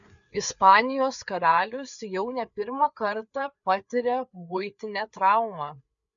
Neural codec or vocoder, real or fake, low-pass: codec, 16 kHz, 4 kbps, FreqCodec, larger model; fake; 7.2 kHz